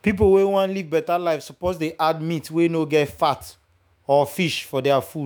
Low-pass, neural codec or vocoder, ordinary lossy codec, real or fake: none; autoencoder, 48 kHz, 128 numbers a frame, DAC-VAE, trained on Japanese speech; none; fake